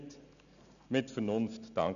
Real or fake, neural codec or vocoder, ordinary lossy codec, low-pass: real; none; none; 7.2 kHz